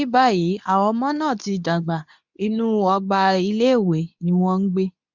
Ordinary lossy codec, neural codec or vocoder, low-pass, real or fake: none; codec, 24 kHz, 0.9 kbps, WavTokenizer, medium speech release version 2; 7.2 kHz; fake